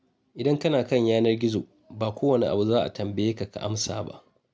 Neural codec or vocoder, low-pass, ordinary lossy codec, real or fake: none; none; none; real